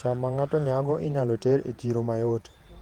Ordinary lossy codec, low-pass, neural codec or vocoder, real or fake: Opus, 24 kbps; 19.8 kHz; vocoder, 48 kHz, 128 mel bands, Vocos; fake